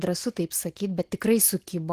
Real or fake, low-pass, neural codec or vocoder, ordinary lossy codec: real; 14.4 kHz; none; Opus, 16 kbps